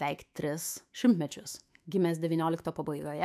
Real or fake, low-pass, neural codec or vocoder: fake; 14.4 kHz; autoencoder, 48 kHz, 128 numbers a frame, DAC-VAE, trained on Japanese speech